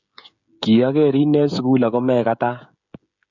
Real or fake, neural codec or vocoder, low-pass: fake; codec, 16 kHz, 16 kbps, FreqCodec, smaller model; 7.2 kHz